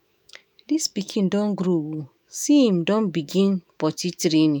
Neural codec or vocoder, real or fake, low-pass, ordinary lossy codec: autoencoder, 48 kHz, 128 numbers a frame, DAC-VAE, trained on Japanese speech; fake; none; none